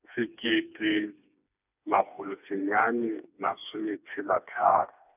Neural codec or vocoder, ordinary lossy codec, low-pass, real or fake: codec, 16 kHz, 2 kbps, FreqCodec, smaller model; none; 3.6 kHz; fake